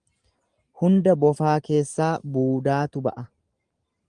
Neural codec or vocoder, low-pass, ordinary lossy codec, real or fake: none; 9.9 kHz; Opus, 24 kbps; real